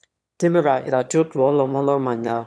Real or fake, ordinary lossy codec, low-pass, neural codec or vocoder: fake; none; none; autoencoder, 22.05 kHz, a latent of 192 numbers a frame, VITS, trained on one speaker